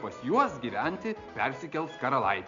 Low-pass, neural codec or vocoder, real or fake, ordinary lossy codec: 7.2 kHz; none; real; MP3, 64 kbps